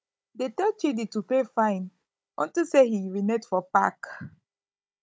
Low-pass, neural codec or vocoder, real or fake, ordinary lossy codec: none; codec, 16 kHz, 16 kbps, FunCodec, trained on Chinese and English, 50 frames a second; fake; none